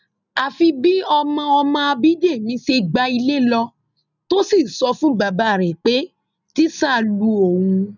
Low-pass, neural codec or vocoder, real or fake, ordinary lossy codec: 7.2 kHz; none; real; none